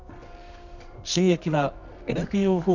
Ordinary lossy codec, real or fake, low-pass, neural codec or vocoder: none; fake; 7.2 kHz; codec, 24 kHz, 0.9 kbps, WavTokenizer, medium music audio release